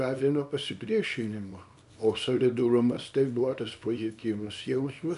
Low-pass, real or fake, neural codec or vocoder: 10.8 kHz; fake; codec, 24 kHz, 0.9 kbps, WavTokenizer, small release